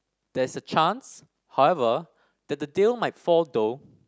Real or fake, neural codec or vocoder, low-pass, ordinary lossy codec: real; none; none; none